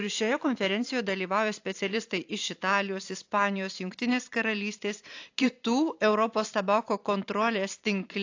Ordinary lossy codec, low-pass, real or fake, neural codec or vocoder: AAC, 48 kbps; 7.2 kHz; fake; vocoder, 44.1 kHz, 128 mel bands every 512 samples, BigVGAN v2